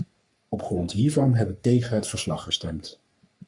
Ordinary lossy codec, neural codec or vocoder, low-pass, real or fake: MP3, 64 kbps; codec, 44.1 kHz, 3.4 kbps, Pupu-Codec; 10.8 kHz; fake